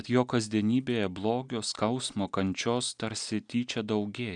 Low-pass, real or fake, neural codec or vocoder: 9.9 kHz; real; none